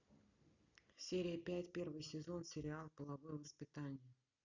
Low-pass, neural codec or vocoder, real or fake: 7.2 kHz; none; real